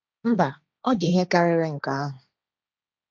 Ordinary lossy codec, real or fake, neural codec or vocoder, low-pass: none; fake; codec, 16 kHz, 1.1 kbps, Voila-Tokenizer; none